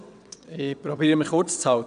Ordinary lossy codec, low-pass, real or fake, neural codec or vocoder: none; 9.9 kHz; fake; vocoder, 22.05 kHz, 80 mel bands, WaveNeXt